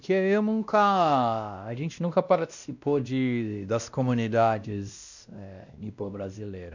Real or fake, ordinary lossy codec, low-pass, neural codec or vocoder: fake; none; 7.2 kHz; codec, 16 kHz, 1 kbps, X-Codec, WavLM features, trained on Multilingual LibriSpeech